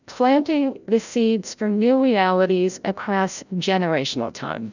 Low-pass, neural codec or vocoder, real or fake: 7.2 kHz; codec, 16 kHz, 0.5 kbps, FreqCodec, larger model; fake